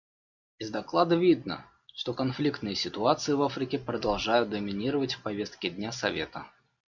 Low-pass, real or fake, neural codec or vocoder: 7.2 kHz; real; none